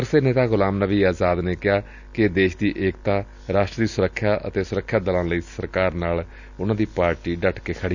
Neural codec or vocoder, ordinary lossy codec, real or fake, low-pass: none; none; real; 7.2 kHz